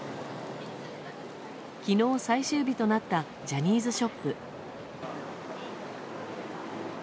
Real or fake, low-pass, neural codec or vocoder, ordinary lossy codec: real; none; none; none